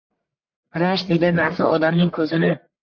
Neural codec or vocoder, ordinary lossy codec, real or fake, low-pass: codec, 44.1 kHz, 1.7 kbps, Pupu-Codec; AAC, 48 kbps; fake; 7.2 kHz